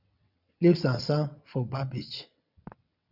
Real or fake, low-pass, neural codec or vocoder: fake; 5.4 kHz; vocoder, 22.05 kHz, 80 mel bands, WaveNeXt